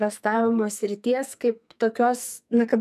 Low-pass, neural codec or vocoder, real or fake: 14.4 kHz; codec, 44.1 kHz, 2.6 kbps, SNAC; fake